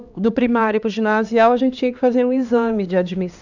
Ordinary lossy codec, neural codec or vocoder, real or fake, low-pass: none; codec, 16 kHz, 2 kbps, X-Codec, HuBERT features, trained on LibriSpeech; fake; 7.2 kHz